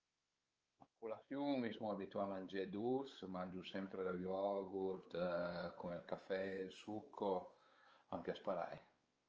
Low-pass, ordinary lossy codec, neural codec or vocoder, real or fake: 7.2 kHz; Opus, 24 kbps; codec, 16 kHz, 4 kbps, X-Codec, WavLM features, trained on Multilingual LibriSpeech; fake